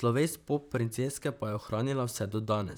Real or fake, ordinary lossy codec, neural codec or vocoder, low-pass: fake; none; vocoder, 44.1 kHz, 128 mel bands, Pupu-Vocoder; none